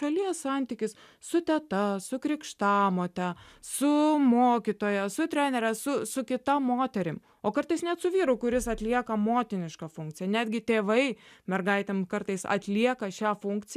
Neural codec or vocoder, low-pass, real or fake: none; 14.4 kHz; real